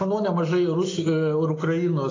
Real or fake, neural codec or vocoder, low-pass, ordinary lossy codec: real; none; 7.2 kHz; AAC, 32 kbps